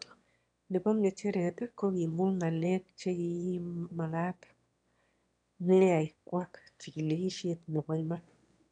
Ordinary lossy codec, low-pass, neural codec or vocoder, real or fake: MP3, 96 kbps; 9.9 kHz; autoencoder, 22.05 kHz, a latent of 192 numbers a frame, VITS, trained on one speaker; fake